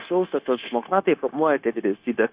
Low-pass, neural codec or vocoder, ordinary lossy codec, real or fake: 3.6 kHz; codec, 16 kHz, 0.9 kbps, LongCat-Audio-Codec; Opus, 64 kbps; fake